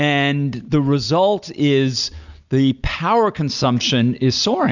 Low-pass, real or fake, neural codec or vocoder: 7.2 kHz; real; none